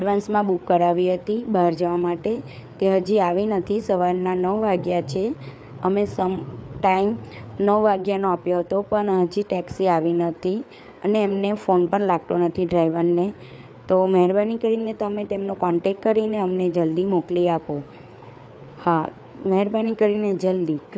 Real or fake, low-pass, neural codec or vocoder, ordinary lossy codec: fake; none; codec, 16 kHz, 4 kbps, FreqCodec, larger model; none